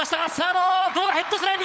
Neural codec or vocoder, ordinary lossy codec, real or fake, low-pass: codec, 16 kHz, 16 kbps, FunCodec, trained on LibriTTS, 50 frames a second; none; fake; none